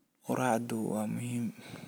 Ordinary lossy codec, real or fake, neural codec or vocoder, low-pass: none; real; none; none